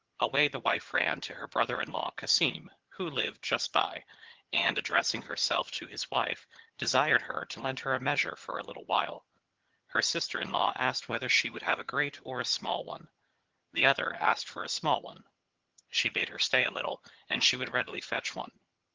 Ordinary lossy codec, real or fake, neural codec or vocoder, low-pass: Opus, 16 kbps; fake; vocoder, 22.05 kHz, 80 mel bands, HiFi-GAN; 7.2 kHz